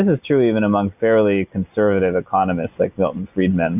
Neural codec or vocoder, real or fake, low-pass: none; real; 3.6 kHz